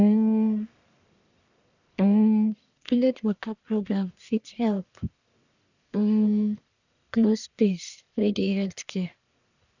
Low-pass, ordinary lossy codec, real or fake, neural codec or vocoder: 7.2 kHz; none; fake; codec, 44.1 kHz, 1.7 kbps, Pupu-Codec